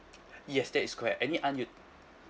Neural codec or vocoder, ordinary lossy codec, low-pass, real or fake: none; none; none; real